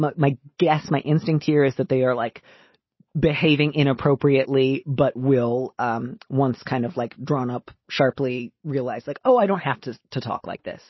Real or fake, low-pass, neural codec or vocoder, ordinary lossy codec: real; 7.2 kHz; none; MP3, 24 kbps